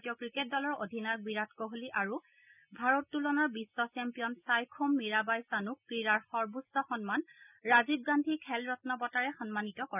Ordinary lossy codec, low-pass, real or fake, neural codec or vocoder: none; 3.6 kHz; real; none